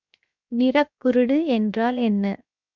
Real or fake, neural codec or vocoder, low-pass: fake; codec, 16 kHz, 0.7 kbps, FocalCodec; 7.2 kHz